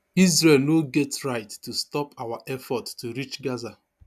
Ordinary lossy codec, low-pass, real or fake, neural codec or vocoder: none; 14.4 kHz; real; none